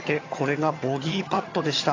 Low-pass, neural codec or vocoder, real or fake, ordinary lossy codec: 7.2 kHz; vocoder, 22.05 kHz, 80 mel bands, HiFi-GAN; fake; AAC, 32 kbps